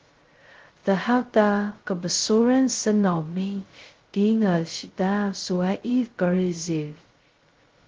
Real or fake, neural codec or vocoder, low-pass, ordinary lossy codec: fake; codec, 16 kHz, 0.2 kbps, FocalCodec; 7.2 kHz; Opus, 16 kbps